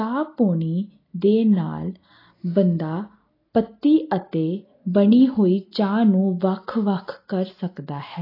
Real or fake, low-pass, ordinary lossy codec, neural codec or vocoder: real; 5.4 kHz; AAC, 24 kbps; none